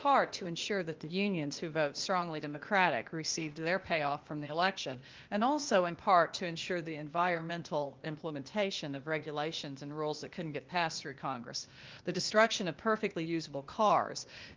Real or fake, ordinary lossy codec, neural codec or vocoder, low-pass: fake; Opus, 32 kbps; codec, 16 kHz, 0.8 kbps, ZipCodec; 7.2 kHz